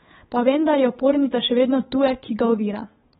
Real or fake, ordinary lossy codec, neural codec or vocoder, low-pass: fake; AAC, 16 kbps; codec, 16 kHz, 8 kbps, FunCodec, trained on Chinese and English, 25 frames a second; 7.2 kHz